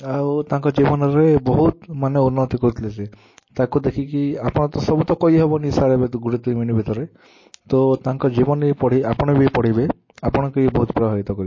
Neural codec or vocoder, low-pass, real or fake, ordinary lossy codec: none; 7.2 kHz; real; MP3, 32 kbps